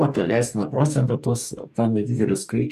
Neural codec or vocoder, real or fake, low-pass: codec, 44.1 kHz, 2.6 kbps, DAC; fake; 14.4 kHz